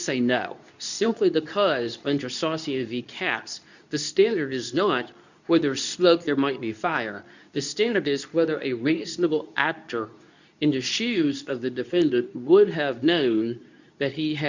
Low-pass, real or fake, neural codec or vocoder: 7.2 kHz; fake; codec, 24 kHz, 0.9 kbps, WavTokenizer, medium speech release version 2